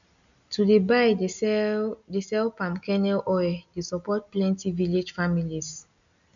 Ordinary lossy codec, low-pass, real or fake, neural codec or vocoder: none; 7.2 kHz; real; none